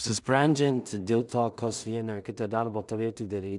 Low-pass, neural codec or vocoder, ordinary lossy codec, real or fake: 10.8 kHz; codec, 16 kHz in and 24 kHz out, 0.4 kbps, LongCat-Audio-Codec, two codebook decoder; MP3, 96 kbps; fake